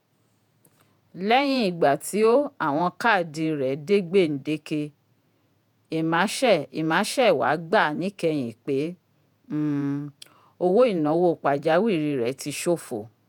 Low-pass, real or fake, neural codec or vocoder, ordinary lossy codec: 19.8 kHz; fake; vocoder, 48 kHz, 128 mel bands, Vocos; none